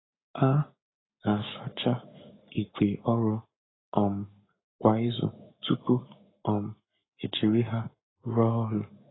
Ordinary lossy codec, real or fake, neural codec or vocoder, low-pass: AAC, 16 kbps; fake; codec, 44.1 kHz, 7.8 kbps, Pupu-Codec; 7.2 kHz